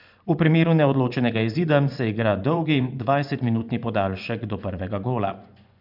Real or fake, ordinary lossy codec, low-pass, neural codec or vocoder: fake; none; 5.4 kHz; vocoder, 24 kHz, 100 mel bands, Vocos